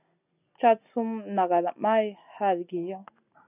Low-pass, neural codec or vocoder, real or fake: 3.6 kHz; none; real